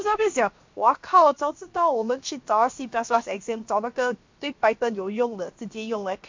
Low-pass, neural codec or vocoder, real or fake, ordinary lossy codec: 7.2 kHz; codec, 16 kHz, 0.7 kbps, FocalCodec; fake; MP3, 48 kbps